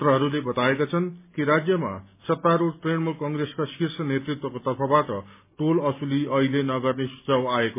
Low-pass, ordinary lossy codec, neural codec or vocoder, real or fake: 3.6 kHz; MP3, 24 kbps; none; real